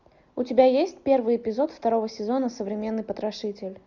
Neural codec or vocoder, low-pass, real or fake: none; 7.2 kHz; real